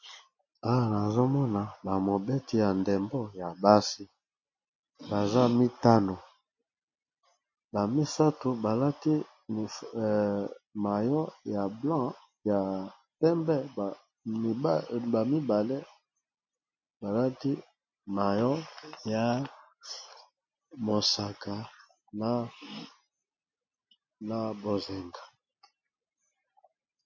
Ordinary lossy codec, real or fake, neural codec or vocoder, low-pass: MP3, 32 kbps; real; none; 7.2 kHz